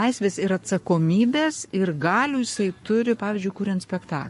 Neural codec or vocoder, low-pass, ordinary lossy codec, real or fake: codec, 44.1 kHz, 7.8 kbps, Pupu-Codec; 14.4 kHz; MP3, 48 kbps; fake